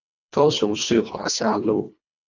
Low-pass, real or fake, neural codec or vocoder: 7.2 kHz; fake; codec, 24 kHz, 1.5 kbps, HILCodec